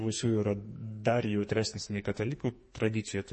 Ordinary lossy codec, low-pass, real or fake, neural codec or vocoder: MP3, 32 kbps; 10.8 kHz; fake; codec, 44.1 kHz, 2.6 kbps, SNAC